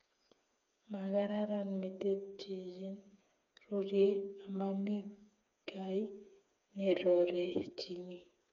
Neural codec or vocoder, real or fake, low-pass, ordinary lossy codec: codec, 16 kHz, 4 kbps, FreqCodec, smaller model; fake; 7.2 kHz; none